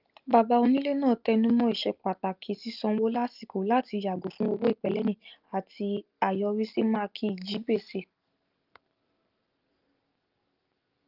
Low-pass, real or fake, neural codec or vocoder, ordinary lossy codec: 5.4 kHz; real; none; Opus, 32 kbps